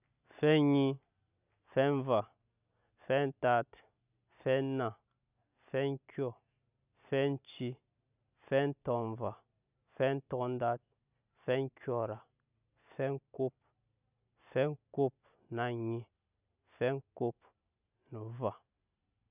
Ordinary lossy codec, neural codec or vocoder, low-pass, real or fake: none; none; 3.6 kHz; real